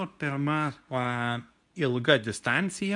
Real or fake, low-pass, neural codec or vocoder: fake; 10.8 kHz; codec, 24 kHz, 0.9 kbps, WavTokenizer, medium speech release version 2